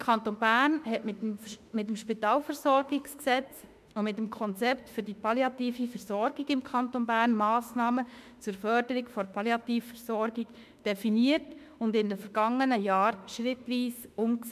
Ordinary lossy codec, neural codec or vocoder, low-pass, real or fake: MP3, 96 kbps; autoencoder, 48 kHz, 32 numbers a frame, DAC-VAE, trained on Japanese speech; 14.4 kHz; fake